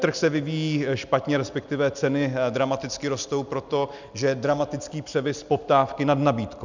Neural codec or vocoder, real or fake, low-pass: none; real; 7.2 kHz